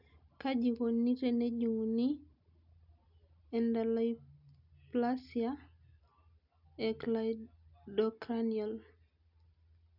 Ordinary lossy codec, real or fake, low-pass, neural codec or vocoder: none; real; 5.4 kHz; none